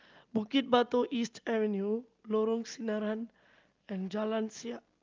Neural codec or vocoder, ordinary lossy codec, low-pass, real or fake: none; Opus, 32 kbps; 7.2 kHz; real